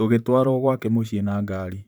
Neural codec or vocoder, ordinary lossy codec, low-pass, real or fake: vocoder, 44.1 kHz, 128 mel bands, Pupu-Vocoder; none; none; fake